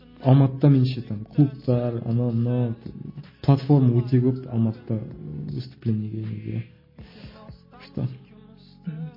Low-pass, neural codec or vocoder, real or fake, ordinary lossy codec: 5.4 kHz; none; real; MP3, 24 kbps